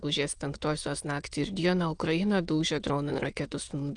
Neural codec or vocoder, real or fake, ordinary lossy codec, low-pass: autoencoder, 22.05 kHz, a latent of 192 numbers a frame, VITS, trained on many speakers; fake; Opus, 32 kbps; 9.9 kHz